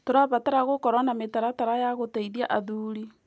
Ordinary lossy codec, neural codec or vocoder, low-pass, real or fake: none; none; none; real